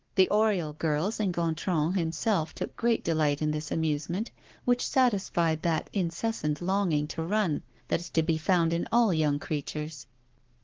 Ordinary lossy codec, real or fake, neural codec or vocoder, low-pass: Opus, 16 kbps; fake; codec, 44.1 kHz, 7.8 kbps, Pupu-Codec; 7.2 kHz